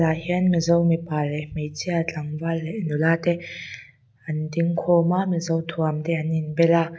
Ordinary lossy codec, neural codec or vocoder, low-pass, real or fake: none; none; none; real